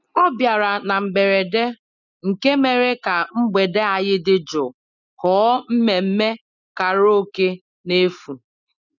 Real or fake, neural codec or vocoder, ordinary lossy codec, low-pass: real; none; none; 7.2 kHz